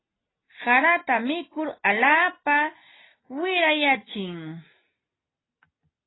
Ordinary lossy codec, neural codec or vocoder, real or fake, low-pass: AAC, 16 kbps; none; real; 7.2 kHz